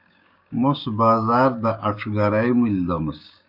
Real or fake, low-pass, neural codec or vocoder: fake; 5.4 kHz; codec, 16 kHz, 6 kbps, DAC